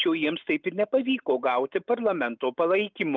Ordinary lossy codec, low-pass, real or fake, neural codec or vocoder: Opus, 24 kbps; 7.2 kHz; real; none